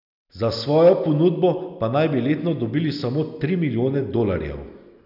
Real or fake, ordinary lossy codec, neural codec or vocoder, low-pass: real; none; none; 5.4 kHz